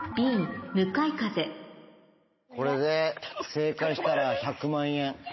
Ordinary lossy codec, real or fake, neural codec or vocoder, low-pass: MP3, 24 kbps; real; none; 7.2 kHz